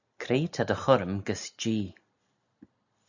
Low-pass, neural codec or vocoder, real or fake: 7.2 kHz; none; real